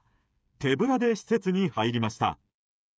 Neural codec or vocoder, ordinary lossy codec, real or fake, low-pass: codec, 16 kHz, 8 kbps, FreqCodec, smaller model; none; fake; none